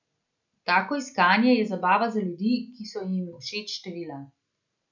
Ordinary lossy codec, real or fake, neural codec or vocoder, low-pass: none; real; none; 7.2 kHz